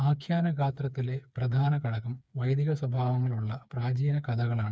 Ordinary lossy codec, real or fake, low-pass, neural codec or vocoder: none; fake; none; codec, 16 kHz, 4 kbps, FreqCodec, smaller model